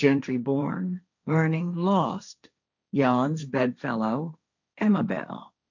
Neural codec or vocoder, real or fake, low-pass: codec, 16 kHz, 1.1 kbps, Voila-Tokenizer; fake; 7.2 kHz